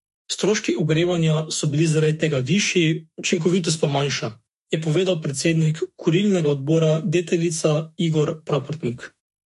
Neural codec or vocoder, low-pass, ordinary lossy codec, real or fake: autoencoder, 48 kHz, 32 numbers a frame, DAC-VAE, trained on Japanese speech; 14.4 kHz; MP3, 48 kbps; fake